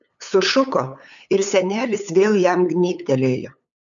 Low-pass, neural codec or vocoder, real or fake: 7.2 kHz; codec, 16 kHz, 8 kbps, FunCodec, trained on LibriTTS, 25 frames a second; fake